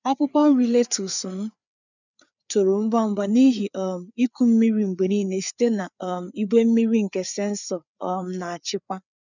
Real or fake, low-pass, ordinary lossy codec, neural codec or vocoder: fake; 7.2 kHz; none; codec, 16 kHz, 4 kbps, FreqCodec, larger model